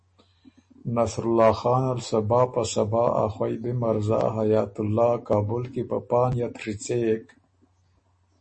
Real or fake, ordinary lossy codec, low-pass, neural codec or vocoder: real; MP3, 32 kbps; 10.8 kHz; none